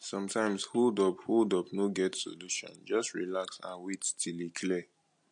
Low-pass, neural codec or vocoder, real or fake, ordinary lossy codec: 9.9 kHz; none; real; MP3, 48 kbps